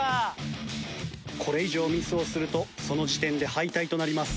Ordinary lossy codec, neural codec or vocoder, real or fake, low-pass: none; none; real; none